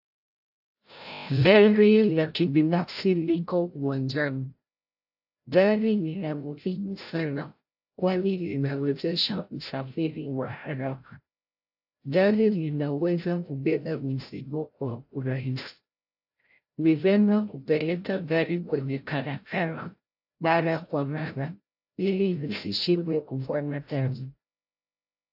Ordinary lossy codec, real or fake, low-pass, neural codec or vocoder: AAC, 48 kbps; fake; 5.4 kHz; codec, 16 kHz, 0.5 kbps, FreqCodec, larger model